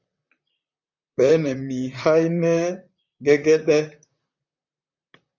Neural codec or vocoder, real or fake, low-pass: vocoder, 44.1 kHz, 128 mel bands, Pupu-Vocoder; fake; 7.2 kHz